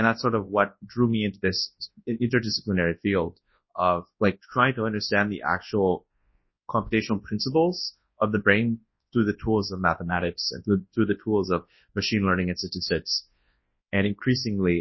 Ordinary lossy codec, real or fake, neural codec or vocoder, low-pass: MP3, 24 kbps; fake; codec, 24 kHz, 0.9 kbps, WavTokenizer, large speech release; 7.2 kHz